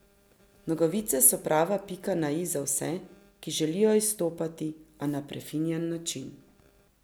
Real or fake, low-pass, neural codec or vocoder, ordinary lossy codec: real; none; none; none